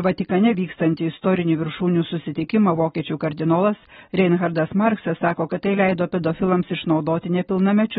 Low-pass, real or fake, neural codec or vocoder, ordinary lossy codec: 10.8 kHz; real; none; AAC, 16 kbps